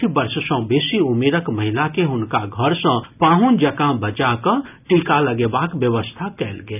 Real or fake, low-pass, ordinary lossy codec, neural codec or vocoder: real; 3.6 kHz; none; none